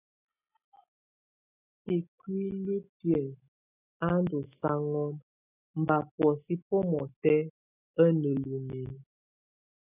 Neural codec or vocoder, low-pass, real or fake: none; 3.6 kHz; real